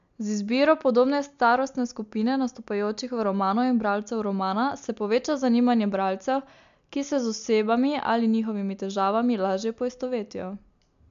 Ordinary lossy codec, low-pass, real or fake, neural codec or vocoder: MP3, 64 kbps; 7.2 kHz; real; none